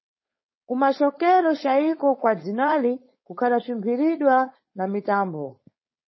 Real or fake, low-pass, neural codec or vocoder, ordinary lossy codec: fake; 7.2 kHz; codec, 16 kHz, 4.8 kbps, FACodec; MP3, 24 kbps